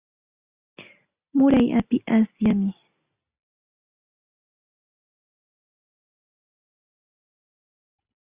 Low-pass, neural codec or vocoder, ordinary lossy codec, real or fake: 3.6 kHz; none; Opus, 64 kbps; real